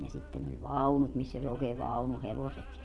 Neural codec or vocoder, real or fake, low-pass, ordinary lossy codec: vocoder, 22.05 kHz, 80 mel bands, WaveNeXt; fake; none; none